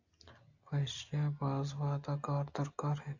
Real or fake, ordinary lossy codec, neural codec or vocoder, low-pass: real; AAC, 48 kbps; none; 7.2 kHz